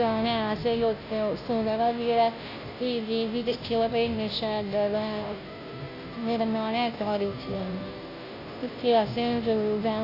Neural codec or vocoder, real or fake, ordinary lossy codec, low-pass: codec, 16 kHz, 0.5 kbps, FunCodec, trained on Chinese and English, 25 frames a second; fake; AAC, 32 kbps; 5.4 kHz